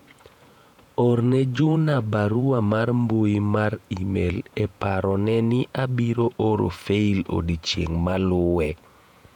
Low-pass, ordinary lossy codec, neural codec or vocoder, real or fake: 19.8 kHz; none; vocoder, 48 kHz, 128 mel bands, Vocos; fake